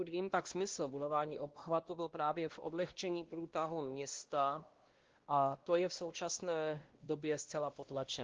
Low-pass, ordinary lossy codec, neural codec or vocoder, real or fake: 7.2 kHz; Opus, 16 kbps; codec, 16 kHz, 1 kbps, X-Codec, WavLM features, trained on Multilingual LibriSpeech; fake